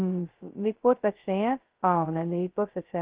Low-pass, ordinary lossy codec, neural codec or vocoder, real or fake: 3.6 kHz; Opus, 16 kbps; codec, 16 kHz, 0.2 kbps, FocalCodec; fake